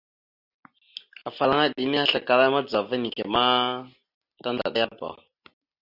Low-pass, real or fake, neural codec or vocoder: 5.4 kHz; real; none